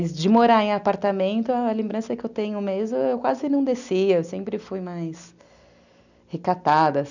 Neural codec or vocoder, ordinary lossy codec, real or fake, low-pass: none; none; real; 7.2 kHz